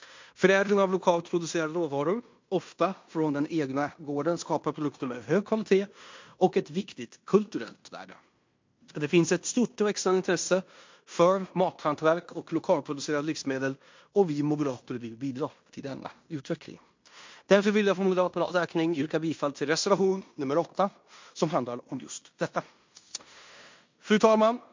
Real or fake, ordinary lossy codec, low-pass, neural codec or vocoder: fake; MP3, 48 kbps; 7.2 kHz; codec, 16 kHz in and 24 kHz out, 0.9 kbps, LongCat-Audio-Codec, fine tuned four codebook decoder